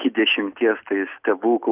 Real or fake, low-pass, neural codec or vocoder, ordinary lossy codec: real; 3.6 kHz; none; Opus, 64 kbps